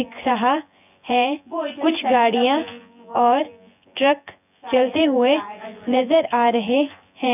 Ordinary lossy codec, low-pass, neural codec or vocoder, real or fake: none; 3.6 kHz; vocoder, 24 kHz, 100 mel bands, Vocos; fake